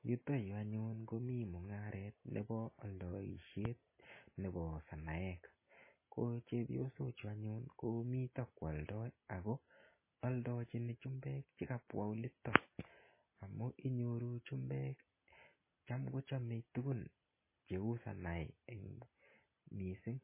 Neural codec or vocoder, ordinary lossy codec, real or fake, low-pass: none; MP3, 16 kbps; real; 3.6 kHz